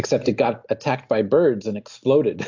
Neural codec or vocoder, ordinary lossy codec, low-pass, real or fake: none; AAC, 48 kbps; 7.2 kHz; real